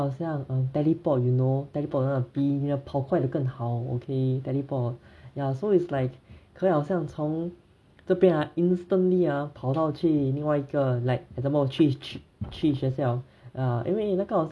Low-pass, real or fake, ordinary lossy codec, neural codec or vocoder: none; real; none; none